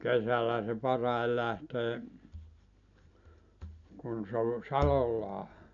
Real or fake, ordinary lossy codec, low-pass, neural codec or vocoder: real; none; 7.2 kHz; none